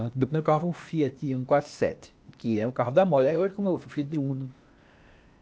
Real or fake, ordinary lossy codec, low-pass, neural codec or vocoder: fake; none; none; codec, 16 kHz, 0.8 kbps, ZipCodec